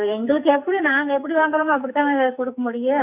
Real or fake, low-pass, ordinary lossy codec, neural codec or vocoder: fake; 3.6 kHz; none; codec, 44.1 kHz, 2.6 kbps, SNAC